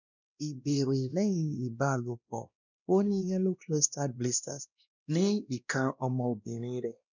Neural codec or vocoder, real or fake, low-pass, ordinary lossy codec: codec, 16 kHz, 1 kbps, X-Codec, WavLM features, trained on Multilingual LibriSpeech; fake; 7.2 kHz; none